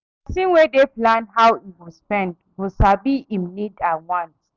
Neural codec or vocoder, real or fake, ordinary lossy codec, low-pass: none; real; none; 7.2 kHz